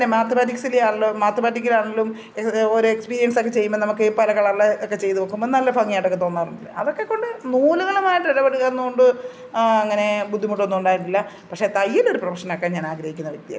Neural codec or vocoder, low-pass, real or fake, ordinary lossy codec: none; none; real; none